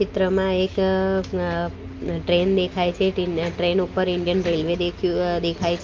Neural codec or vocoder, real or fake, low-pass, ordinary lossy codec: none; real; 7.2 kHz; Opus, 24 kbps